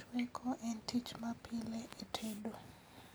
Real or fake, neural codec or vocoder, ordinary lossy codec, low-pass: real; none; none; none